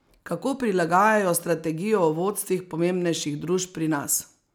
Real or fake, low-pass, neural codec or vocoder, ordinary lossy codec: real; none; none; none